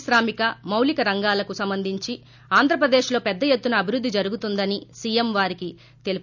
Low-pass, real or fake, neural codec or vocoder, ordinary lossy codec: 7.2 kHz; real; none; none